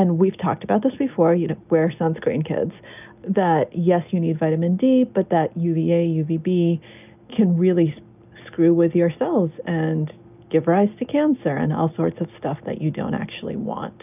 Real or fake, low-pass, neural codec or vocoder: real; 3.6 kHz; none